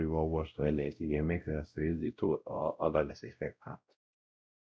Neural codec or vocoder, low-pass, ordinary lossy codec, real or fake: codec, 16 kHz, 0.5 kbps, X-Codec, WavLM features, trained on Multilingual LibriSpeech; none; none; fake